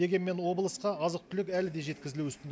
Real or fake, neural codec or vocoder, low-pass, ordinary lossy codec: real; none; none; none